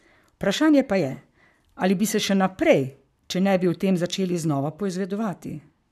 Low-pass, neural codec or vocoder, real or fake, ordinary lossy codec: 14.4 kHz; codec, 44.1 kHz, 7.8 kbps, Pupu-Codec; fake; none